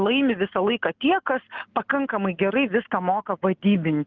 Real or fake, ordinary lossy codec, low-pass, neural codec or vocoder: real; Opus, 32 kbps; 7.2 kHz; none